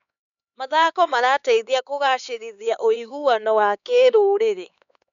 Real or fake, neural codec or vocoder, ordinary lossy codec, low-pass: fake; codec, 16 kHz, 4 kbps, X-Codec, HuBERT features, trained on LibriSpeech; none; 7.2 kHz